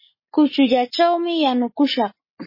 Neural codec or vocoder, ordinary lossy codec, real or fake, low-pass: codec, 44.1 kHz, 7.8 kbps, Pupu-Codec; MP3, 24 kbps; fake; 5.4 kHz